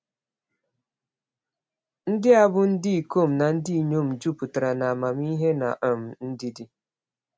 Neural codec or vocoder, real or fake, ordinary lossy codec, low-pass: none; real; none; none